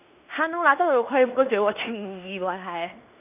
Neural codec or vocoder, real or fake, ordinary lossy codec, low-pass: codec, 16 kHz in and 24 kHz out, 0.9 kbps, LongCat-Audio-Codec, fine tuned four codebook decoder; fake; none; 3.6 kHz